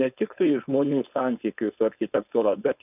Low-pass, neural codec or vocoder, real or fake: 3.6 kHz; codec, 16 kHz, 4.8 kbps, FACodec; fake